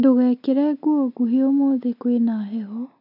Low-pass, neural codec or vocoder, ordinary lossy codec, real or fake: 5.4 kHz; none; none; real